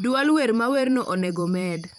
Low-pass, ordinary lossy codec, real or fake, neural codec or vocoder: 19.8 kHz; none; fake; vocoder, 44.1 kHz, 128 mel bands every 512 samples, BigVGAN v2